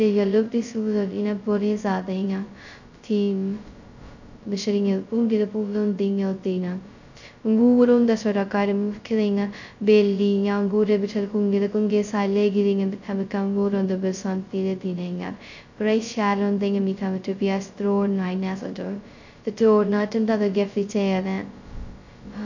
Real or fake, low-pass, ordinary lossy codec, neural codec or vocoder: fake; 7.2 kHz; none; codec, 16 kHz, 0.2 kbps, FocalCodec